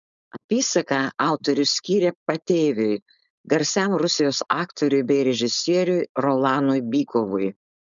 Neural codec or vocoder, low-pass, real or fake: codec, 16 kHz, 4.8 kbps, FACodec; 7.2 kHz; fake